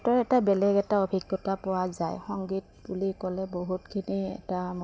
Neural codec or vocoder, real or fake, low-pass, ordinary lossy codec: none; real; none; none